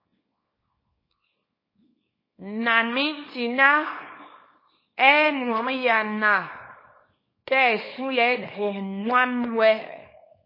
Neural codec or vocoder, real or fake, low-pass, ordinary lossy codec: codec, 24 kHz, 0.9 kbps, WavTokenizer, small release; fake; 5.4 kHz; MP3, 24 kbps